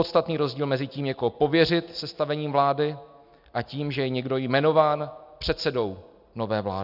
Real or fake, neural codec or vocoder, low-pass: real; none; 5.4 kHz